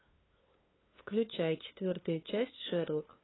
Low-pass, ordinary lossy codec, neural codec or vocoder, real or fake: 7.2 kHz; AAC, 16 kbps; codec, 16 kHz, 2 kbps, FunCodec, trained on LibriTTS, 25 frames a second; fake